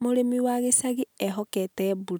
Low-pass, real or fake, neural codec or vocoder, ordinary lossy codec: none; real; none; none